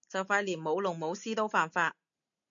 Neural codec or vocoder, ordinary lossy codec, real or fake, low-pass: none; MP3, 64 kbps; real; 7.2 kHz